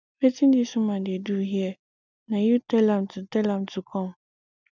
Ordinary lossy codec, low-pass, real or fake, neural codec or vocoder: none; 7.2 kHz; real; none